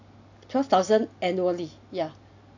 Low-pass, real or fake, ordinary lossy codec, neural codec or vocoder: 7.2 kHz; real; AAC, 48 kbps; none